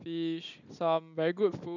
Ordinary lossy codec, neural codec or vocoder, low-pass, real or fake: none; none; 7.2 kHz; real